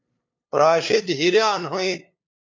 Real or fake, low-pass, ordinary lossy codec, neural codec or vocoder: fake; 7.2 kHz; MP3, 48 kbps; codec, 16 kHz, 2 kbps, FunCodec, trained on LibriTTS, 25 frames a second